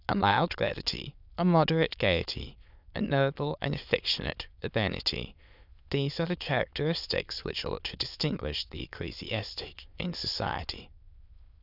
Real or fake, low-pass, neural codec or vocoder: fake; 5.4 kHz; autoencoder, 22.05 kHz, a latent of 192 numbers a frame, VITS, trained on many speakers